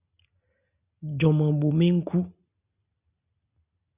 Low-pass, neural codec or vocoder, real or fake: 3.6 kHz; none; real